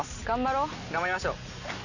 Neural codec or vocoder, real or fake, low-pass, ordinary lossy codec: none; real; 7.2 kHz; none